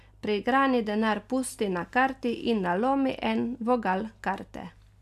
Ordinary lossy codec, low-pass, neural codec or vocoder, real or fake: none; 14.4 kHz; none; real